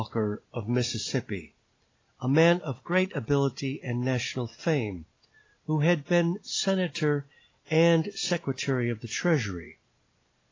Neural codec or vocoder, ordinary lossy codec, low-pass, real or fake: none; AAC, 32 kbps; 7.2 kHz; real